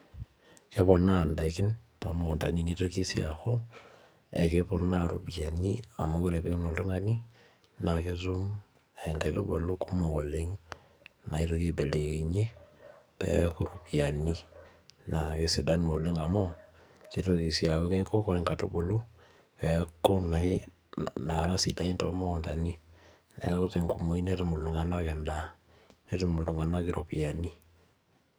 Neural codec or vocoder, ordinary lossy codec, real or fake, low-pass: codec, 44.1 kHz, 2.6 kbps, SNAC; none; fake; none